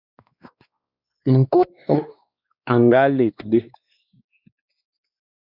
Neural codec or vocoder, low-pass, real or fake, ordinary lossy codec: codec, 16 kHz, 2 kbps, X-Codec, WavLM features, trained on Multilingual LibriSpeech; 5.4 kHz; fake; Opus, 64 kbps